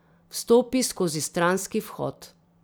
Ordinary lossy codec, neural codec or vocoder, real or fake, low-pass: none; vocoder, 44.1 kHz, 128 mel bands every 256 samples, BigVGAN v2; fake; none